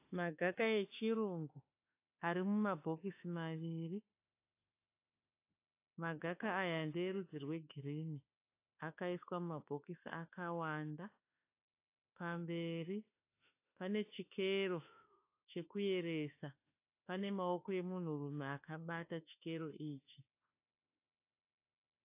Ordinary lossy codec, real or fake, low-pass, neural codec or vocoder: AAC, 24 kbps; fake; 3.6 kHz; autoencoder, 48 kHz, 32 numbers a frame, DAC-VAE, trained on Japanese speech